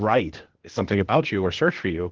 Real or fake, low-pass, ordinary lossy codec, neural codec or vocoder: fake; 7.2 kHz; Opus, 16 kbps; codec, 16 kHz, 0.8 kbps, ZipCodec